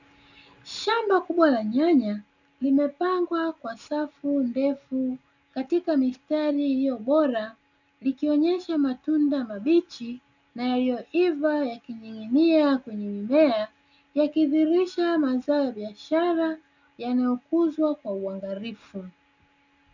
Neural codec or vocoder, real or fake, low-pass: none; real; 7.2 kHz